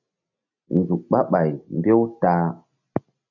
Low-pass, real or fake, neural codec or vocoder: 7.2 kHz; real; none